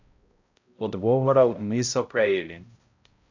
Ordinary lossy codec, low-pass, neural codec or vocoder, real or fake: AAC, 48 kbps; 7.2 kHz; codec, 16 kHz, 0.5 kbps, X-Codec, HuBERT features, trained on balanced general audio; fake